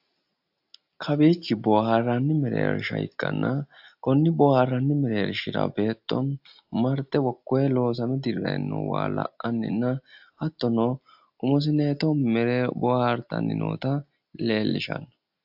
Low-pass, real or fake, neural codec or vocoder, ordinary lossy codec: 5.4 kHz; real; none; AAC, 48 kbps